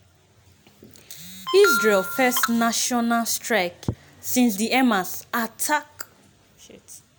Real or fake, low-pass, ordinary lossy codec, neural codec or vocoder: real; none; none; none